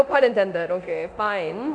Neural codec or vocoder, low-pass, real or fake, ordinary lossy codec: codec, 24 kHz, 0.9 kbps, DualCodec; 9.9 kHz; fake; none